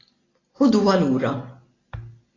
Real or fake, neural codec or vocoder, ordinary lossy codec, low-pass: real; none; AAC, 32 kbps; 7.2 kHz